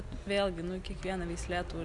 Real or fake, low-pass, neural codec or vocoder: real; 10.8 kHz; none